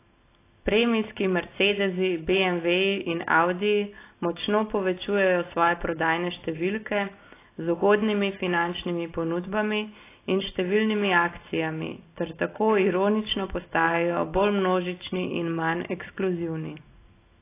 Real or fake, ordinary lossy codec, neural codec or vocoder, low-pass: real; AAC, 24 kbps; none; 3.6 kHz